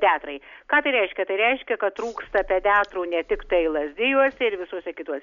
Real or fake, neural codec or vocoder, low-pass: real; none; 7.2 kHz